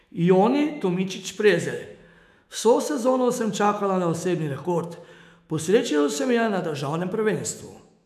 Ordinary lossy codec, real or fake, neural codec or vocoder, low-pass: none; fake; autoencoder, 48 kHz, 128 numbers a frame, DAC-VAE, trained on Japanese speech; 14.4 kHz